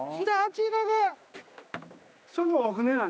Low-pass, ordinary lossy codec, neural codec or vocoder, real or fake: none; none; codec, 16 kHz, 2 kbps, X-Codec, HuBERT features, trained on general audio; fake